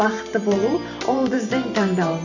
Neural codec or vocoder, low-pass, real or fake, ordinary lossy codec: vocoder, 44.1 kHz, 128 mel bands every 512 samples, BigVGAN v2; 7.2 kHz; fake; none